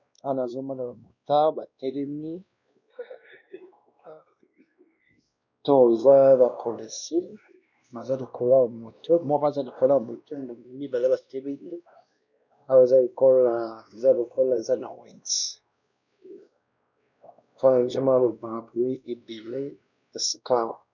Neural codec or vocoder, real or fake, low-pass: codec, 16 kHz, 1 kbps, X-Codec, WavLM features, trained on Multilingual LibriSpeech; fake; 7.2 kHz